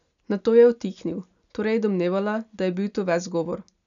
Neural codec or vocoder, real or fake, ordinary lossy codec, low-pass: none; real; none; 7.2 kHz